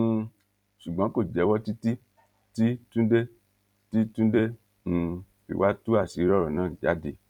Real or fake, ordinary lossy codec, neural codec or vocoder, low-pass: fake; none; vocoder, 44.1 kHz, 128 mel bands every 256 samples, BigVGAN v2; 19.8 kHz